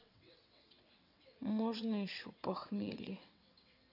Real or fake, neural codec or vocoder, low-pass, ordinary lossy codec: fake; vocoder, 22.05 kHz, 80 mel bands, WaveNeXt; 5.4 kHz; none